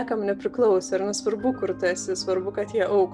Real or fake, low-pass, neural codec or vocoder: real; 9.9 kHz; none